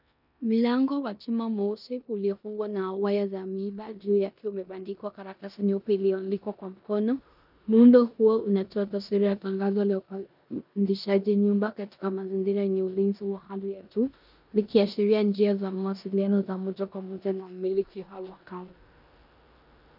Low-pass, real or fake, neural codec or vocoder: 5.4 kHz; fake; codec, 16 kHz in and 24 kHz out, 0.9 kbps, LongCat-Audio-Codec, four codebook decoder